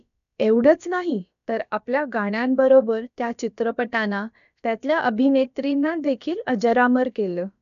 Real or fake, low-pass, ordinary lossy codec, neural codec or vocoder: fake; 7.2 kHz; none; codec, 16 kHz, about 1 kbps, DyCAST, with the encoder's durations